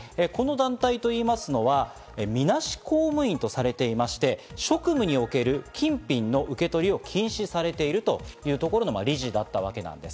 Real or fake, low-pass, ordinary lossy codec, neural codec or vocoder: real; none; none; none